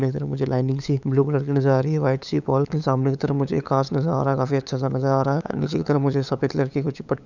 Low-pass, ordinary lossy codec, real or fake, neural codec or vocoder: 7.2 kHz; none; fake; codec, 16 kHz, 8 kbps, FunCodec, trained on LibriTTS, 25 frames a second